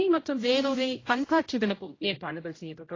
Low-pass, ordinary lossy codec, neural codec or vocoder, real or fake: 7.2 kHz; AAC, 32 kbps; codec, 16 kHz, 0.5 kbps, X-Codec, HuBERT features, trained on general audio; fake